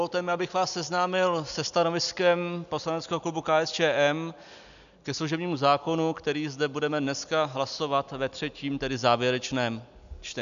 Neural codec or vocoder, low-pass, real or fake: none; 7.2 kHz; real